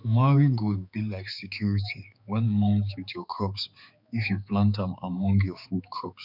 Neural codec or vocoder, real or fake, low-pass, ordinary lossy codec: codec, 16 kHz, 4 kbps, X-Codec, HuBERT features, trained on balanced general audio; fake; 5.4 kHz; none